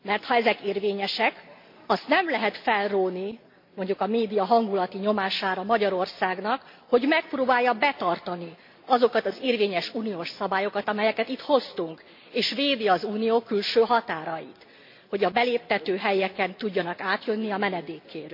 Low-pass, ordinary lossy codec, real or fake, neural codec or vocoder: 5.4 kHz; MP3, 32 kbps; real; none